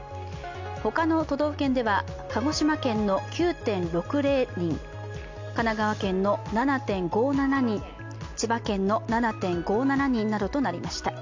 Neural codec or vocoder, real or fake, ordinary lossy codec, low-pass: none; real; MP3, 48 kbps; 7.2 kHz